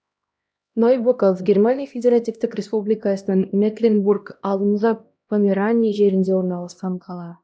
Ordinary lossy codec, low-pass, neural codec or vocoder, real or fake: none; none; codec, 16 kHz, 1 kbps, X-Codec, HuBERT features, trained on LibriSpeech; fake